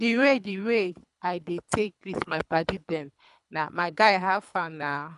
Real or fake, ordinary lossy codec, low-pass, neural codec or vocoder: fake; none; 10.8 kHz; codec, 24 kHz, 3 kbps, HILCodec